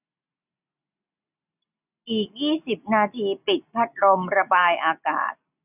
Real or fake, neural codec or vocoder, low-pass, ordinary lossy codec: real; none; 3.6 kHz; none